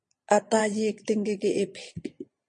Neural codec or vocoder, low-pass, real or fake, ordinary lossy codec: vocoder, 22.05 kHz, 80 mel bands, Vocos; 9.9 kHz; fake; MP3, 48 kbps